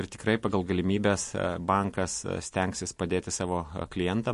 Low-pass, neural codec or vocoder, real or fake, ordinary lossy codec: 14.4 kHz; none; real; MP3, 48 kbps